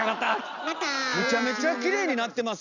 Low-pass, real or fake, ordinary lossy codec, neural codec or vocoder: 7.2 kHz; real; none; none